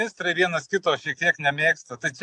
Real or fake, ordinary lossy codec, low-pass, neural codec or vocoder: real; AAC, 64 kbps; 10.8 kHz; none